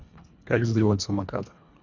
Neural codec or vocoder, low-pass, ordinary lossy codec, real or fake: codec, 24 kHz, 1.5 kbps, HILCodec; 7.2 kHz; none; fake